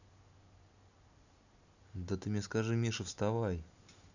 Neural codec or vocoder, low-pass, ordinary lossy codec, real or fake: none; 7.2 kHz; none; real